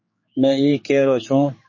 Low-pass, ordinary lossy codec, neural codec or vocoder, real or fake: 7.2 kHz; MP3, 32 kbps; codec, 16 kHz, 4 kbps, X-Codec, HuBERT features, trained on general audio; fake